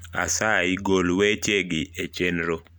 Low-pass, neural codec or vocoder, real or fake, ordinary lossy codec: none; none; real; none